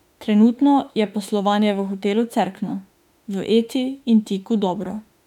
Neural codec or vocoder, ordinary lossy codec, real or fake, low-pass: autoencoder, 48 kHz, 32 numbers a frame, DAC-VAE, trained on Japanese speech; none; fake; 19.8 kHz